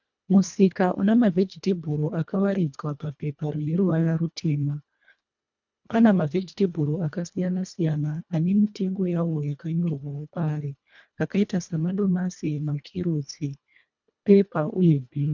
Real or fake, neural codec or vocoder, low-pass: fake; codec, 24 kHz, 1.5 kbps, HILCodec; 7.2 kHz